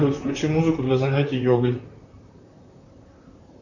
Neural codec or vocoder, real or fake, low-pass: vocoder, 44.1 kHz, 128 mel bands, Pupu-Vocoder; fake; 7.2 kHz